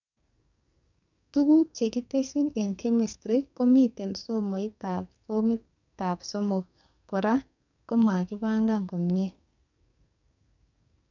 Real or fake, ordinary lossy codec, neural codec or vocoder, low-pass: fake; none; codec, 44.1 kHz, 2.6 kbps, SNAC; 7.2 kHz